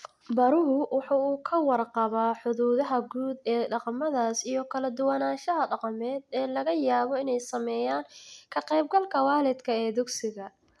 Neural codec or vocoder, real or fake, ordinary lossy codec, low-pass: none; real; none; none